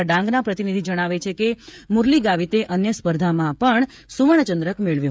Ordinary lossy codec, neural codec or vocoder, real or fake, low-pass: none; codec, 16 kHz, 16 kbps, FreqCodec, smaller model; fake; none